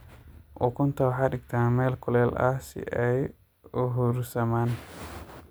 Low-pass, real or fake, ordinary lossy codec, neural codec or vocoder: none; real; none; none